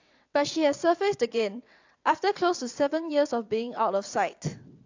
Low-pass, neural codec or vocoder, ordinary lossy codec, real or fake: 7.2 kHz; vocoder, 22.05 kHz, 80 mel bands, WaveNeXt; AAC, 48 kbps; fake